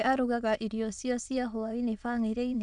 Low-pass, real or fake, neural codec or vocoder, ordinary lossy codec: 9.9 kHz; fake; autoencoder, 22.05 kHz, a latent of 192 numbers a frame, VITS, trained on many speakers; none